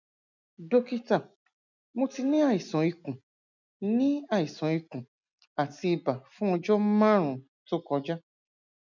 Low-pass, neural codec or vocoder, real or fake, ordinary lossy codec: 7.2 kHz; none; real; none